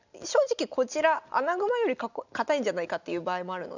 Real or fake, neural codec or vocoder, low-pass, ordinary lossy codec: real; none; 7.2 kHz; none